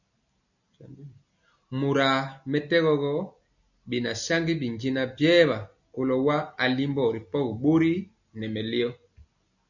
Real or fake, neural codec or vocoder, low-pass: real; none; 7.2 kHz